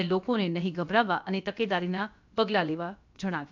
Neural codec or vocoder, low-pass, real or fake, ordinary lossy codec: codec, 16 kHz, about 1 kbps, DyCAST, with the encoder's durations; 7.2 kHz; fake; MP3, 64 kbps